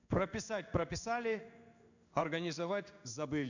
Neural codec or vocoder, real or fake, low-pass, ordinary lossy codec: codec, 16 kHz in and 24 kHz out, 1 kbps, XY-Tokenizer; fake; 7.2 kHz; none